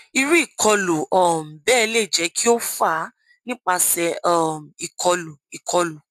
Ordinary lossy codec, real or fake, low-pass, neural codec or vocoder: AAC, 96 kbps; real; 14.4 kHz; none